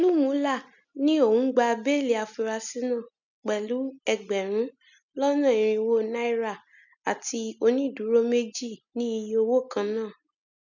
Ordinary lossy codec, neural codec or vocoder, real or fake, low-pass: none; none; real; 7.2 kHz